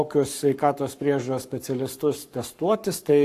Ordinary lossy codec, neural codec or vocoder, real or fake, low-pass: AAC, 64 kbps; codec, 44.1 kHz, 7.8 kbps, Pupu-Codec; fake; 14.4 kHz